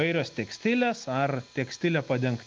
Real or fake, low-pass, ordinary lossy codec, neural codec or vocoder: real; 7.2 kHz; Opus, 24 kbps; none